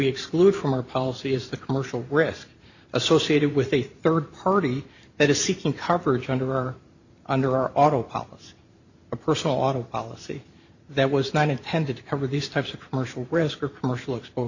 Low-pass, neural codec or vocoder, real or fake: 7.2 kHz; vocoder, 44.1 kHz, 128 mel bands every 512 samples, BigVGAN v2; fake